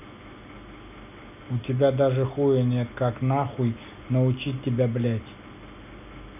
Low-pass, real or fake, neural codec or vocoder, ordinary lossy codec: 3.6 kHz; real; none; none